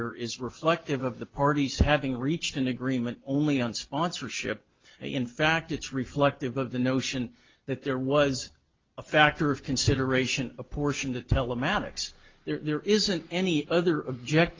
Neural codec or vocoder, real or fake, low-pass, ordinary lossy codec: none; real; 7.2 kHz; Opus, 32 kbps